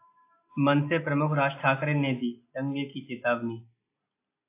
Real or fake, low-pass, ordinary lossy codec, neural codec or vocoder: real; 3.6 kHz; AAC, 24 kbps; none